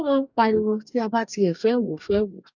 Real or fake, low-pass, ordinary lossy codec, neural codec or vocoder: fake; 7.2 kHz; none; codec, 44.1 kHz, 2.6 kbps, DAC